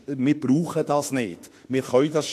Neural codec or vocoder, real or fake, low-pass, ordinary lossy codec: autoencoder, 48 kHz, 32 numbers a frame, DAC-VAE, trained on Japanese speech; fake; 14.4 kHz; AAC, 64 kbps